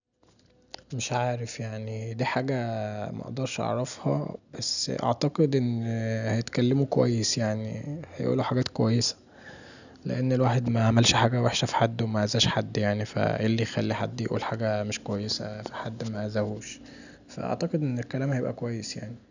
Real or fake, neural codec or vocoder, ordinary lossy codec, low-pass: real; none; none; 7.2 kHz